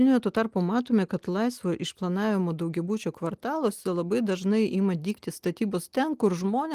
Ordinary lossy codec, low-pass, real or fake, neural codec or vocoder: Opus, 24 kbps; 14.4 kHz; real; none